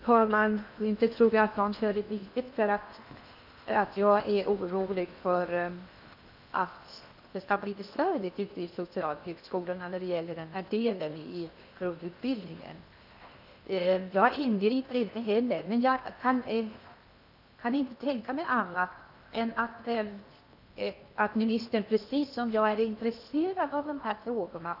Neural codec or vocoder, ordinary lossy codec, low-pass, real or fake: codec, 16 kHz in and 24 kHz out, 0.8 kbps, FocalCodec, streaming, 65536 codes; none; 5.4 kHz; fake